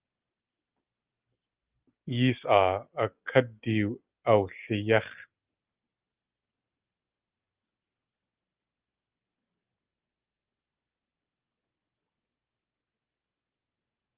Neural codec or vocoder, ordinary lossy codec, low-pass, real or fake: none; Opus, 24 kbps; 3.6 kHz; real